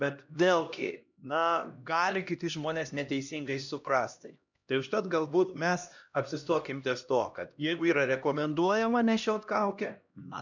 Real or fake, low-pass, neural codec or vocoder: fake; 7.2 kHz; codec, 16 kHz, 1 kbps, X-Codec, HuBERT features, trained on LibriSpeech